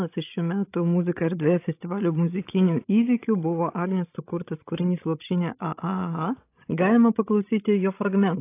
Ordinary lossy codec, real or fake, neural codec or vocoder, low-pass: AAC, 24 kbps; fake; codec, 16 kHz, 16 kbps, FreqCodec, larger model; 3.6 kHz